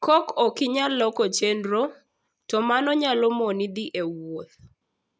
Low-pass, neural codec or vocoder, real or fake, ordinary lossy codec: none; none; real; none